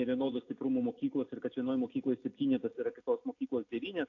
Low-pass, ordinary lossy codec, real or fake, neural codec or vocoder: 7.2 kHz; AAC, 48 kbps; real; none